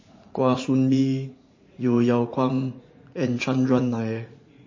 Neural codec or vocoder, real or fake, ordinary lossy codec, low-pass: vocoder, 44.1 kHz, 80 mel bands, Vocos; fake; MP3, 32 kbps; 7.2 kHz